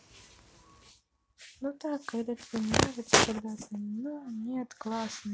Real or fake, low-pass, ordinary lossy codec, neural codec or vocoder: real; none; none; none